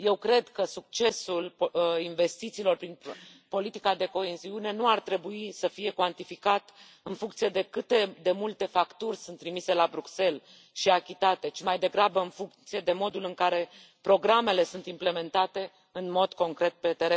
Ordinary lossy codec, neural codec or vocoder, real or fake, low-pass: none; none; real; none